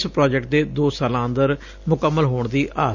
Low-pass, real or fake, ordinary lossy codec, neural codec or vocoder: 7.2 kHz; real; none; none